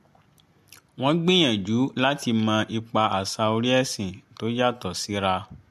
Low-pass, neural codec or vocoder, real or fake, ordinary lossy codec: 14.4 kHz; none; real; MP3, 64 kbps